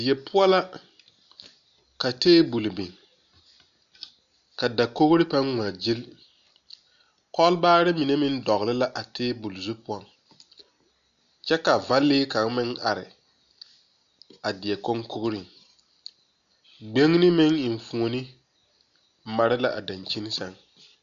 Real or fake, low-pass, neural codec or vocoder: real; 7.2 kHz; none